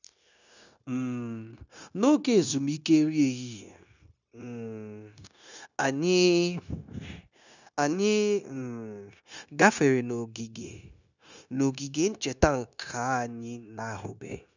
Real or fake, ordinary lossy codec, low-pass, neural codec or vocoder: fake; none; 7.2 kHz; codec, 16 kHz, 0.9 kbps, LongCat-Audio-Codec